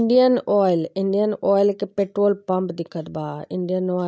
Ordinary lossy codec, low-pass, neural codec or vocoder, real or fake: none; none; none; real